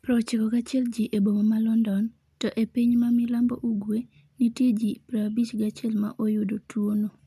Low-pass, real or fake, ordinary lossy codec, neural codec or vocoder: 14.4 kHz; real; none; none